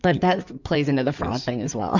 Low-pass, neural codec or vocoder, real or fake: 7.2 kHz; codec, 16 kHz in and 24 kHz out, 2.2 kbps, FireRedTTS-2 codec; fake